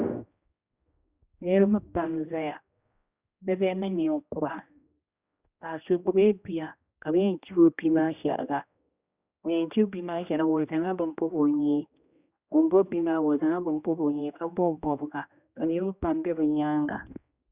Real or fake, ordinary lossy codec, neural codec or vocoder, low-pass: fake; Opus, 64 kbps; codec, 16 kHz, 1 kbps, X-Codec, HuBERT features, trained on general audio; 3.6 kHz